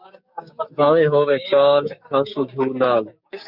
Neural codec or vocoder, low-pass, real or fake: codec, 16 kHz, 6 kbps, DAC; 5.4 kHz; fake